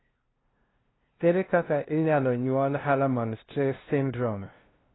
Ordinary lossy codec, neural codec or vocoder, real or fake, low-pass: AAC, 16 kbps; codec, 16 kHz, 0.5 kbps, FunCodec, trained on LibriTTS, 25 frames a second; fake; 7.2 kHz